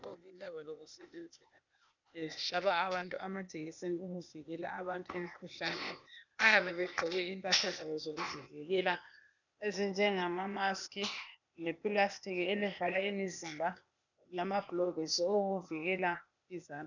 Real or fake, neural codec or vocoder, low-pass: fake; codec, 16 kHz, 0.8 kbps, ZipCodec; 7.2 kHz